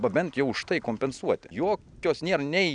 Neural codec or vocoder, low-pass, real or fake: none; 9.9 kHz; real